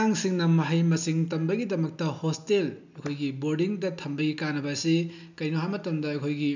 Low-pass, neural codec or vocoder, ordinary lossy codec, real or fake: 7.2 kHz; none; none; real